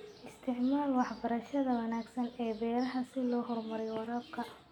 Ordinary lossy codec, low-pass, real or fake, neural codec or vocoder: none; 19.8 kHz; real; none